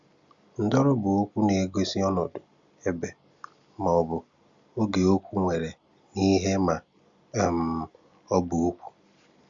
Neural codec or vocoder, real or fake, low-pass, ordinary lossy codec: none; real; 7.2 kHz; Opus, 64 kbps